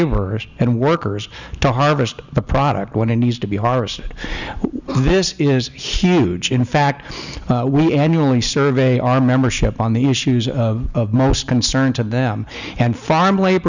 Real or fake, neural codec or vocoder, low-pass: real; none; 7.2 kHz